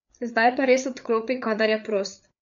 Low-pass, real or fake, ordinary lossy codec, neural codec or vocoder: 7.2 kHz; fake; none; codec, 16 kHz, 4 kbps, FreqCodec, larger model